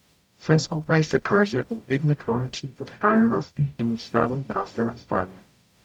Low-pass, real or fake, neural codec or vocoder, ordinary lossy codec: 19.8 kHz; fake; codec, 44.1 kHz, 0.9 kbps, DAC; none